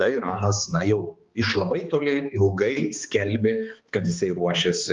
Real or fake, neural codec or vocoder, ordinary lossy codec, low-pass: fake; codec, 16 kHz, 2 kbps, X-Codec, HuBERT features, trained on balanced general audio; Opus, 32 kbps; 7.2 kHz